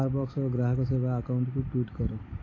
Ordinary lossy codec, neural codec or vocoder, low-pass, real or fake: AAC, 32 kbps; none; 7.2 kHz; real